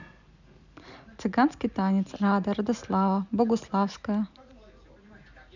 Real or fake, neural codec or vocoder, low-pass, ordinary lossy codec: real; none; 7.2 kHz; none